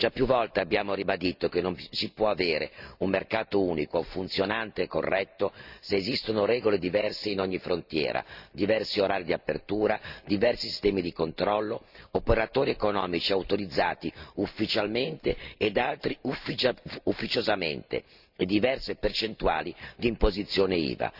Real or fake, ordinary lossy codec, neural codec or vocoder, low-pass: real; Opus, 64 kbps; none; 5.4 kHz